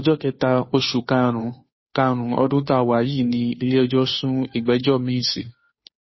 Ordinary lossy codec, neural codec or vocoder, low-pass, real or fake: MP3, 24 kbps; codec, 16 kHz, 2 kbps, FunCodec, trained on Chinese and English, 25 frames a second; 7.2 kHz; fake